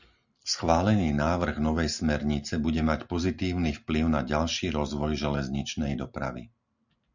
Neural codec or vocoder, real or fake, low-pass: none; real; 7.2 kHz